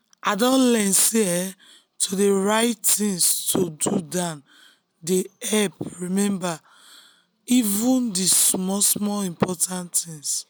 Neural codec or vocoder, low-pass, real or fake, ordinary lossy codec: none; none; real; none